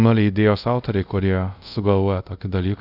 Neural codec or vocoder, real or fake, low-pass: codec, 24 kHz, 0.5 kbps, DualCodec; fake; 5.4 kHz